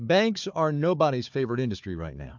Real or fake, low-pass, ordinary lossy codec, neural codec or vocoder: fake; 7.2 kHz; MP3, 64 kbps; codec, 16 kHz, 4 kbps, FunCodec, trained on Chinese and English, 50 frames a second